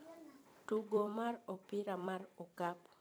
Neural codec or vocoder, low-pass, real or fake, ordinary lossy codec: vocoder, 44.1 kHz, 128 mel bands every 256 samples, BigVGAN v2; none; fake; none